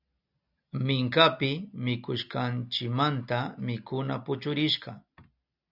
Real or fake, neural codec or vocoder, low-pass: real; none; 5.4 kHz